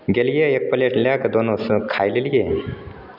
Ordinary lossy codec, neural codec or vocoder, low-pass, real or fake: none; none; 5.4 kHz; real